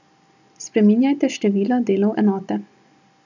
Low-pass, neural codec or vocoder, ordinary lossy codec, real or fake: 7.2 kHz; none; none; real